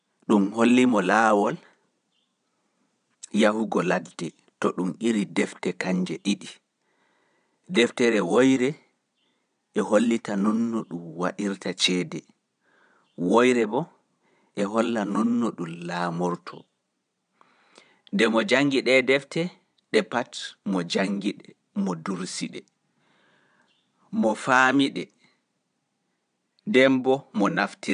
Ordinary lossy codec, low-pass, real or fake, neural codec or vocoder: none; 9.9 kHz; fake; vocoder, 22.05 kHz, 80 mel bands, Vocos